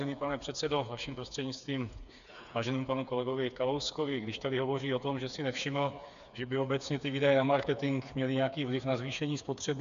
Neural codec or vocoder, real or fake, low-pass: codec, 16 kHz, 4 kbps, FreqCodec, smaller model; fake; 7.2 kHz